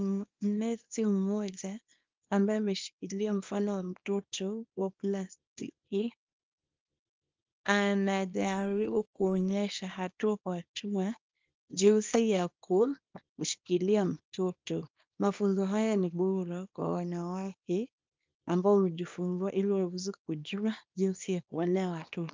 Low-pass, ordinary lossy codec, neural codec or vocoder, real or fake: 7.2 kHz; Opus, 24 kbps; codec, 24 kHz, 0.9 kbps, WavTokenizer, small release; fake